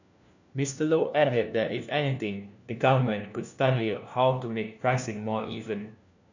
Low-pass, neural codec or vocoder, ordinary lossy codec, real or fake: 7.2 kHz; codec, 16 kHz, 1 kbps, FunCodec, trained on LibriTTS, 50 frames a second; none; fake